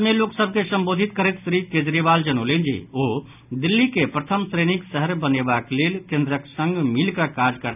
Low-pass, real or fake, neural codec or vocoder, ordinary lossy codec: 3.6 kHz; real; none; none